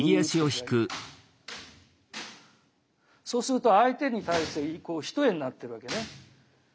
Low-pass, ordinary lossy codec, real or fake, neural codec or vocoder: none; none; real; none